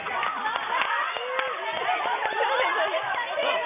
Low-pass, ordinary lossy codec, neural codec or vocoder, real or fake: 3.6 kHz; none; none; real